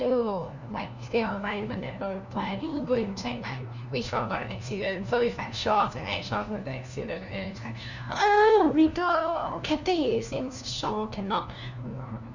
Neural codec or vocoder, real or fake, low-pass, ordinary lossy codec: codec, 16 kHz, 1 kbps, FunCodec, trained on LibriTTS, 50 frames a second; fake; 7.2 kHz; none